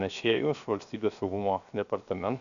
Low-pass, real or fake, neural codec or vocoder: 7.2 kHz; fake; codec, 16 kHz, 0.7 kbps, FocalCodec